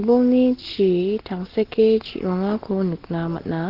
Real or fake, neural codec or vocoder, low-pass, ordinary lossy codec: real; none; 5.4 kHz; Opus, 16 kbps